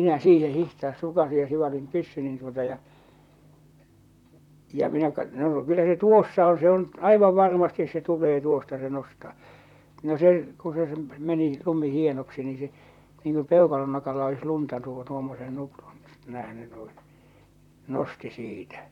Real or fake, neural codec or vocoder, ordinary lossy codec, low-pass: fake; vocoder, 44.1 kHz, 128 mel bands, Pupu-Vocoder; none; 19.8 kHz